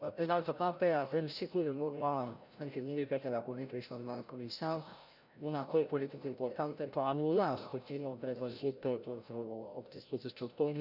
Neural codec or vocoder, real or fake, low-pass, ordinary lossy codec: codec, 16 kHz, 0.5 kbps, FreqCodec, larger model; fake; 5.4 kHz; none